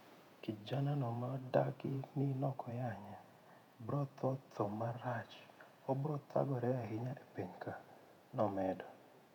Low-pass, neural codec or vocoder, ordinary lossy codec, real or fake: 19.8 kHz; vocoder, 48 kHz, 128 mel bands, Vocos; none; fake